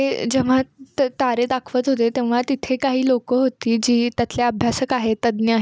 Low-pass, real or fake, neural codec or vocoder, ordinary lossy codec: none; real; none; none